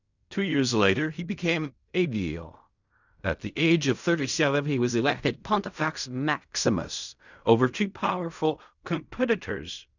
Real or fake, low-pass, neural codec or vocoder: fake; 7.2 kHz; codec, 16 kHz in and 24 kHz out, 0.4 kbps, LongCat-Audio-Codec, fine tuned four codebook decoder